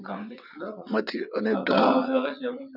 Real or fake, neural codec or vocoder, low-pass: fake; vocoder, 22.05 kHz, 80 mel bands, WaveNeXt; 5.4 kHz